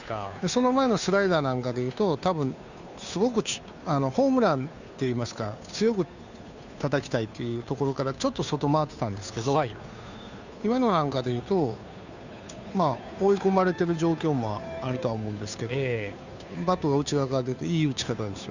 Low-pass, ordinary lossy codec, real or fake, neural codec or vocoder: 7.2 kHz; none; fake; codec, 16 kHz, 2 kbps, FunCodec, trained on Chinese and English, 25 frames a second